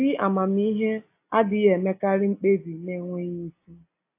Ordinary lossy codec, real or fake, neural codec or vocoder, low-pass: AAC, 24 kbps; real; none; 3.6 kHz